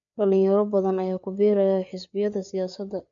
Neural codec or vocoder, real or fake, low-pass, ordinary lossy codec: codec, 16 kHz, 4 kbps, FreqCodec, larger model; fake; 7.2 kHz; none